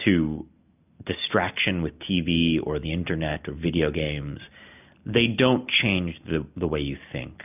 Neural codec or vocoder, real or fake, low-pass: none; real; 3.6 kHz